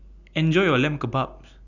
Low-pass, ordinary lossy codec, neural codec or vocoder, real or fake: 7.2 kHz; none; none; real